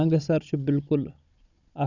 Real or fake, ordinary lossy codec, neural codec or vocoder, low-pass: fake; none; codec, 16 kHz, 16 kbps, FunCodec, trained on Chinese and English, 50 frames a second; 7.2 kHz